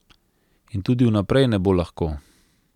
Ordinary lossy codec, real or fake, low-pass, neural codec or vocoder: none; real; 19.8 kHz; none